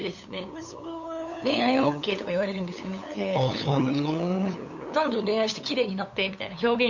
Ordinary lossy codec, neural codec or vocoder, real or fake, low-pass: none; codec, 16 kHz, 8 kbps, FunCodec, trained on LibriTTS, 25 frames a second; fake; 7.2 kHz